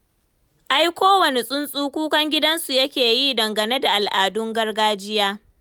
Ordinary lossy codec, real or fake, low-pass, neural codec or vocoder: none; real; none; none